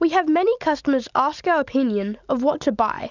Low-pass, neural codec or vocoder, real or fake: 7.2 kHz; none; real